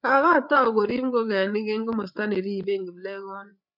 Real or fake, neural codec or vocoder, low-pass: fake; codec, 16 kHz, 8 kbps, FreqCodec, larger model; 5.4 kHz